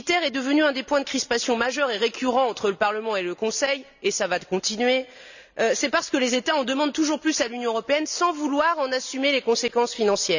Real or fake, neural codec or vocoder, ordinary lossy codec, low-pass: real; none; none; 7.2 kHz